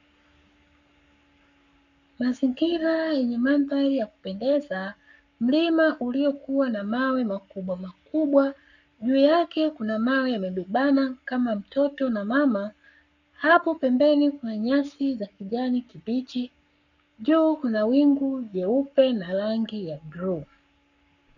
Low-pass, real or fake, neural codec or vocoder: 7.2 kHz; fake; codec, 44.1 kHz, 7.8 kbps, Pupu-Codec